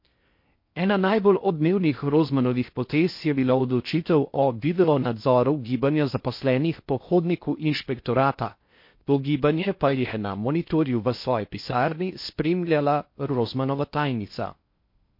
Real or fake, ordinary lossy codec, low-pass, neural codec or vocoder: fake; MP3, 32 kbps; 5.4 kHz; codec, 16 kHz in and 24 kHz out, 0.6 kbps, FocalCodec, streaming, 4096 codes